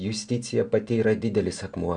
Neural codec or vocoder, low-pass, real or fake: none; 9.9 kHz; real